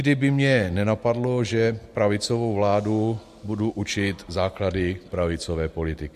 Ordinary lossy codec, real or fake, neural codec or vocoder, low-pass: MP3, 64 kbps; real; none; 14.4 kHz